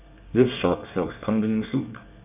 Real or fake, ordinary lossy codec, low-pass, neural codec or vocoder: fake; none; 3.6 kHz; codec, 24 kHz, 1 kbps, SNAC